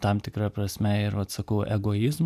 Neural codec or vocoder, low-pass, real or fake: none; 14.4 kHz; real